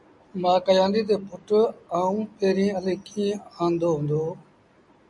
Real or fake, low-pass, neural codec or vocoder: real; 10.8 kHz; none